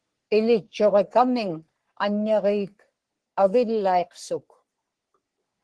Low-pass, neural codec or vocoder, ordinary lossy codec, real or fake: 10.8 kHz; codec, 44.1 kHz, 3.4 kbps, Pupu-Codec; Opus, 16 kbps; fake